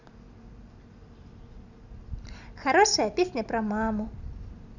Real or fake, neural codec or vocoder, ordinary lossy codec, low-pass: real; none; none; 7.2 kHz